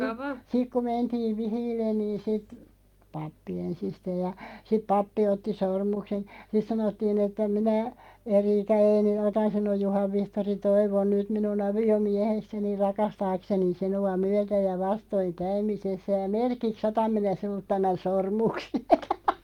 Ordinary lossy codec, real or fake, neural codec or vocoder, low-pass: Opus, 32 kbps; real; none; 19.8 kHz